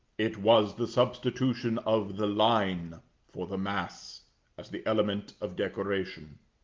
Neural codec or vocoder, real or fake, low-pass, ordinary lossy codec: none; real; 7.2 kHz; Opus, 32 kbps